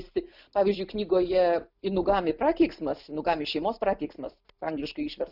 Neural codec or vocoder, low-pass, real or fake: none; 5.4 kHz; real